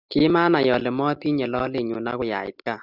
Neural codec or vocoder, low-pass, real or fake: none; 5.4 kHz; real